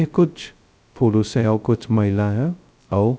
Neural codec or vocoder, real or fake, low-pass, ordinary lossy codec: codec, 16 kHz, 0.2 kbps, FocalCodec; fake; none; none